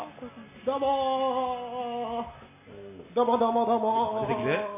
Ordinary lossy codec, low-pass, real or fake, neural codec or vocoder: MP3, 24 kbps; 3.6 kHz; real; none